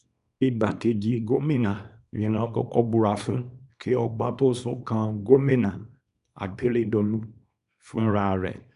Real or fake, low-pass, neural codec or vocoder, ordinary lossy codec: fake; 10.8 kHz; codec, 24 kHz, 0.9 kbps, WavTokenizer, small release; none